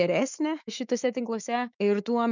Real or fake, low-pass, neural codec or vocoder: fake; 7.2 kHz; autoencoder, 48 kHz, 128 numbers a frame, DAC-VAE, trained on Japanese speech